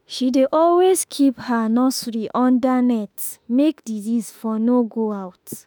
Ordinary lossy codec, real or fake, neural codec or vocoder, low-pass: none; fake; autoencoder, 48 kHz, 32 numbers a frame, DAC-VAE, trained on Japanese speech; none